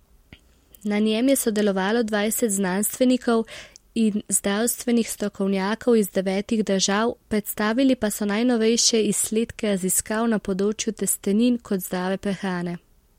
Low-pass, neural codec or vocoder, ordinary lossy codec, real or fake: 19.8 kHz; none; MP3, 64 kbps; real